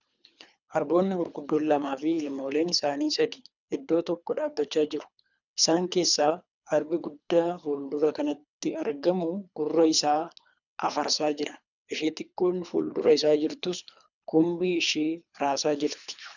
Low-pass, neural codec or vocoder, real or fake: 7.2 kHz; codec, 24 kHz, 3 kbps, HILCodec; fake